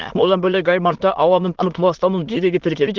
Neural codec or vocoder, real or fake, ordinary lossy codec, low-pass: autoencoder, 22.05 kHz, a latent of 192 numbers a frame, VITS, trained on many speakers; fake; Opus, 16 kbps; 7.2 kHz